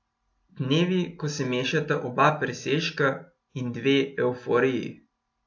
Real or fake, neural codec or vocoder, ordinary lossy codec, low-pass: real; none; AAC, 48 kbps; 7.2 kHz